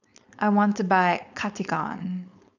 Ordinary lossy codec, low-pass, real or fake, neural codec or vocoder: none; 7.2 kHz; fake; codec, 16 kHz, 4.8 kbps, FACodec